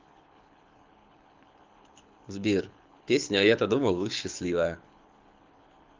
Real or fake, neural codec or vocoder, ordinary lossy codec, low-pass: fake; codec, 24 kHz, 6 kbps, HILCodec; Opus, 24 kbps; 7.2 kHz